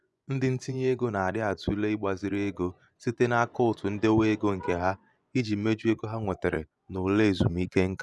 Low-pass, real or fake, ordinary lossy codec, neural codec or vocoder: none; fake; none; vocoder, 24 kHz, 100 mel bands, Vocos